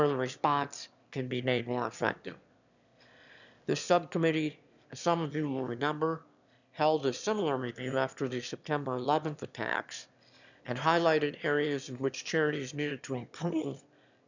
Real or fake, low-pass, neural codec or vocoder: fake; 7.2 kHz; autoencoder, 22.05 kHz, a latent of 192 numbers a frame, VITS, trained on one speaker